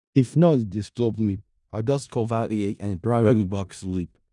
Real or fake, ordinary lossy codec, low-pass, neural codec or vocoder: fake; none; 10.8 kHz; codec, 16 kHz in and 24 kHz out, 0.4 kbps, LongCat-Audio-Codec, four codebook decoder